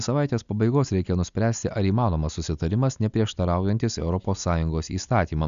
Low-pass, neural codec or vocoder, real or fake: 7.2 kHz; none; real